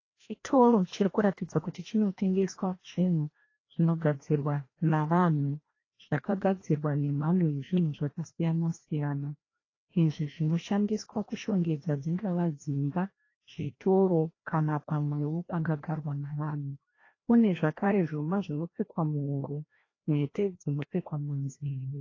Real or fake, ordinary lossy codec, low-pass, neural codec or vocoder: fake; AAC, 32 kbps; 7.2 kHz; codec, 16 kHz, 1 kbps, FreqCodec, larger model